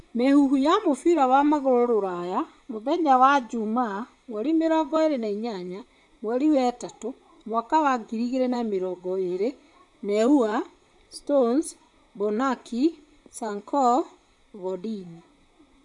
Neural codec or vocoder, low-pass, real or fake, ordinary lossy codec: vocoder, 44.1 kHz, 128 mel bands, Pupu-Vocoder; 10.8 kHz; fake; none